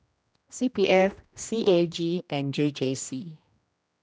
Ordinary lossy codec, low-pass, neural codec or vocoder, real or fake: none; none; codec, 16 kHz, 1 kbps, X-Codec, HuBERT features, trained on general audio; fake